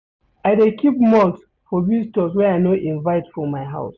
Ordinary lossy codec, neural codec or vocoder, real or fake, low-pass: none; none; real; 7.2 kHz